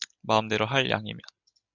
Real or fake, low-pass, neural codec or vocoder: real; 7.2 kHz; none